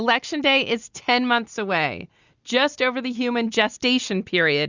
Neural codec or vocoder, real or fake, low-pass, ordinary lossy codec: none; real; 7.2 kHz; Opus, 64 kbps